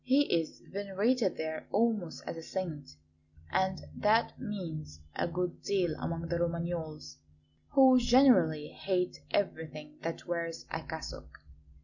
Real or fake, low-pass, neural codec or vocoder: real; 7.2 kHz; none